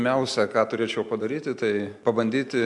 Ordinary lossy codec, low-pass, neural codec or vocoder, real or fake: MP3, 64 kbps; 10.8 kHz; none; real